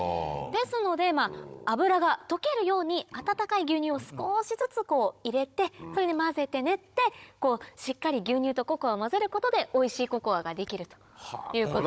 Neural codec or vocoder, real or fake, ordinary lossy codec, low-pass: codec, 16 kHz, 16 kbps, FunCodec, trained on Chinese and English, 50 frames a second; fake; none; none